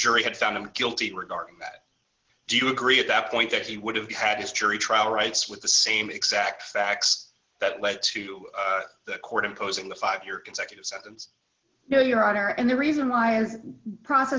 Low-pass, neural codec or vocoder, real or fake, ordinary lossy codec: 7.2 kHz; none; real; Opus, 16 kbps